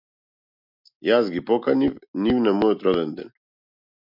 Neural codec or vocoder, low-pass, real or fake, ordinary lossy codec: none; 5.4 kHz; real; MP3, 48 kbps